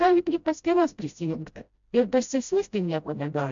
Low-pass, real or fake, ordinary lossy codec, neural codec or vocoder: 7.2 kHz; fake; MP3, 64 kbps; codec, 16 kHz, 0.5 kbps, FreqCodec, smaller model